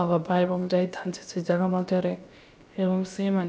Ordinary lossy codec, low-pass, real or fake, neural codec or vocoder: none; none; fake; codec, 16 kHz, about 1 kbps, DyCAST, with the encoder's durations